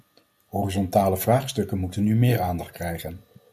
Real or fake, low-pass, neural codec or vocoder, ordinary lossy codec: real; 14.4 kHz; none; MP3, 96 kbps